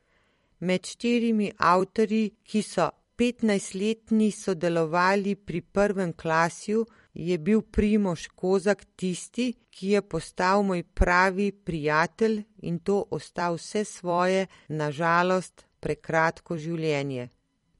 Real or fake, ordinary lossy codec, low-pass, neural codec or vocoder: fake; MP3, 48 kbps; 19.8 kHz; vocoder, 44.1 kHz, 128 mel bands every 256 samples, BigVGAN v2